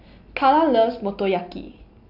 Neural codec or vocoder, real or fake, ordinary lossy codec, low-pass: none; real; none; 5.4 kHz